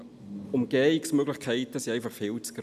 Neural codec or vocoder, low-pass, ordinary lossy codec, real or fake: none; 14.4 kHz; none; real